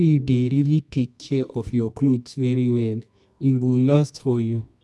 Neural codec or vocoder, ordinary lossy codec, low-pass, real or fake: codec, 24 kHz, 0.9 kbps, WavTokenizer, medium music audio release; none; none; fake